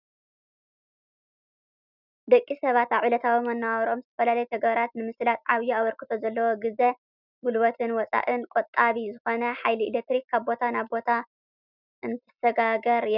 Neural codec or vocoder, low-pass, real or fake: none; 5.4 kHz; real